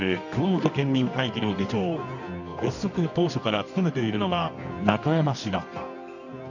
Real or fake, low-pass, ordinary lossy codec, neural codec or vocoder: fake; 7.2 kHz; none; codec, 24 kHz, 0.9 kbps, WavTokenizer, medium music audio release